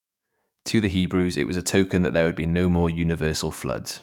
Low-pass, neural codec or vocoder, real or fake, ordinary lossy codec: 19.8 kHz; codec, 44.1 kHz, 7.8 kbps, DAC; fake; none